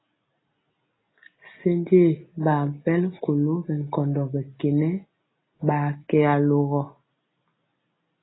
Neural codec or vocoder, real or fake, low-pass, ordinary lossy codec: none; real; 7.2 kHz; AAC, 16 kbps